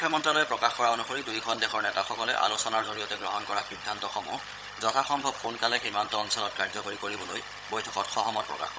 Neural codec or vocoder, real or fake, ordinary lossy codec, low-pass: codec, 16 kHz, 16 kbps, FunCodec, trained on Chinese and English, 50 frames a second; fake; none; none